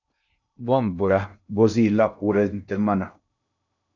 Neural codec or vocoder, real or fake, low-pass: codec, 16 kHz in and 24 kHz out, 0.6 kbps, FocalCodec, streaming, 2048 codes; fake; 7.2 kHz